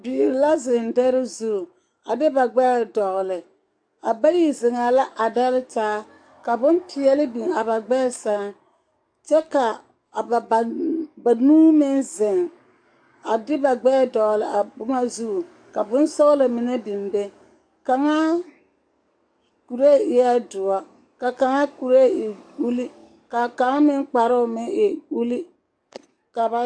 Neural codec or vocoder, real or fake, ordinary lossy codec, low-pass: codec, 44.1 kHz, 7.8 kbps, DAC; fake; AAC, 64 kbps; 9.9 kHz